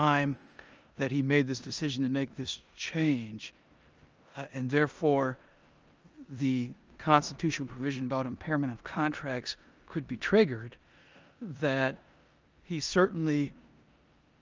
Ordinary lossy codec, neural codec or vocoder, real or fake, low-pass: Opus, 24 kbps; codec, 16 kHz in and 24 kHz out, 0.9 kbps, LongCat-Audio-Codec, four codebook decoder; fake; 7.2 kHz